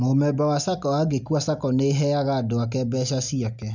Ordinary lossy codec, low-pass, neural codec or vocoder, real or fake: none; 7.2 kHz; none; real